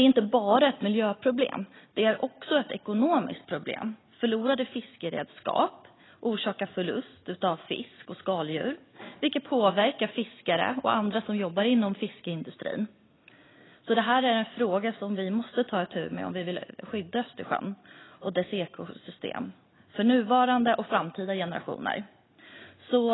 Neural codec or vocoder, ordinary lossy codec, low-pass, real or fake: none; AAC, 16 kbps; 7.2 kHz; real